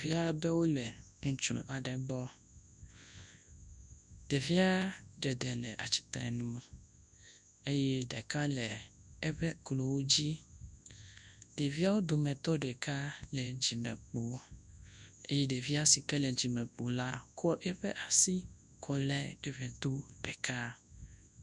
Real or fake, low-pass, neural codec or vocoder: fake; 10.8 kHz; codec, 24 kHz, 0.9 kbps, WavTokenizer, large speech release